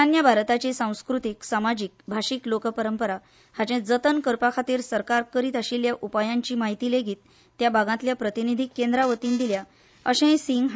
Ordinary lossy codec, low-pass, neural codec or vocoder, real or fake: none; none; none; real